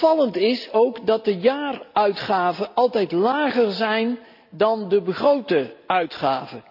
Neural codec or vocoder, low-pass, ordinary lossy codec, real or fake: none; 5.4 kHz; AAC, 48 kbps; real